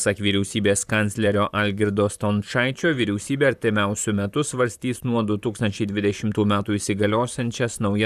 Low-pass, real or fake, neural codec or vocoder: 14.4 kHz; fake; vocoder, 44.1 kHz, 128 mel bands, Pupu-Vocoder